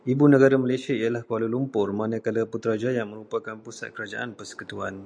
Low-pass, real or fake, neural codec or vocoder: 9.9 kHz; real; none